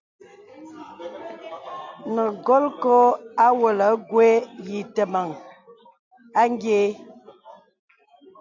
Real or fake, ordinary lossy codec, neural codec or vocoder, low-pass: real; MP3, 64 kbps; none; 7.2 kHz